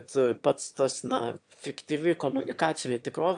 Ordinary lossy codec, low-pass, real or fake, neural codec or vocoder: AAC, 64 kbps; 9.9 kHz; fake; autoencoder, 22.05 kHz, a latent of 192 numbers a frame, VITS, trained on one speaker